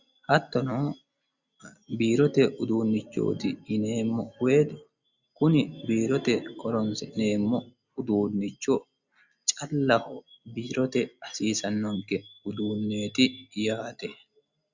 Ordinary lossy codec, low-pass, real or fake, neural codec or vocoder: Opus, 64 kbps; 7.2 kHz; real; none